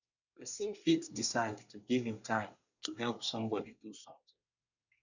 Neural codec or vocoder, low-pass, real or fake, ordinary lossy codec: codec, 24 kHz, 1 kbps, SNAC; 7.2 kHz; fake; none